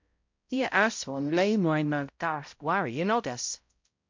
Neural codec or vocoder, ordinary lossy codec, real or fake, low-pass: codec, 16 kHz, 0.5 kbps, X-Codec, HuBERT features, trained on balanced general audio; MP3, 48 kbps; fake; 7.2 kHz